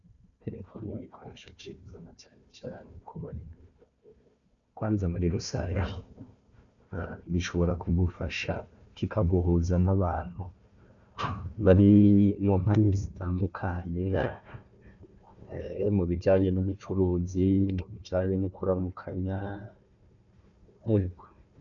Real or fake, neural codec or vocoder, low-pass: fake; codec, 16 kHz, 1 kbps, FunCodec, trained on Chinese and English, 50 frames a second; 7.2 kHz